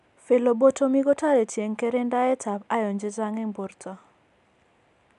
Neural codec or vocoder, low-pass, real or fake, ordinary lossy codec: none; 10.8 kHz; real; none